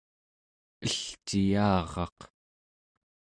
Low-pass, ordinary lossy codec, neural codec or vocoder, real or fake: 9.9 kHz; Opus, 64 kbps; none; real